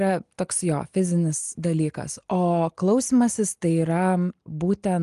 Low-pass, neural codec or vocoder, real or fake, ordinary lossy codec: 10.8 kHz; none; real; Opus, 32 kbps